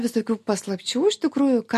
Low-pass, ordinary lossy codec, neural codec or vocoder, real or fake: 14.4 kHz; MP3, 64 kbps; none; real